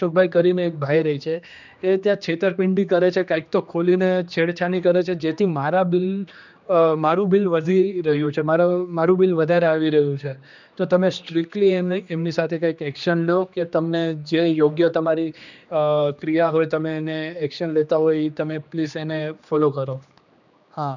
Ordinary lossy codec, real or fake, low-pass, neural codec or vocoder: none; fake; 7.2 kHz; codec, 16 kHz, 2 kbps, X-Codec, HuBERT features, trained on general audio